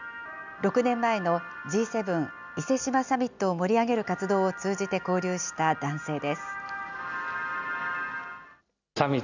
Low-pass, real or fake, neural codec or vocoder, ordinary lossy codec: 7.2 kHz; real; none; none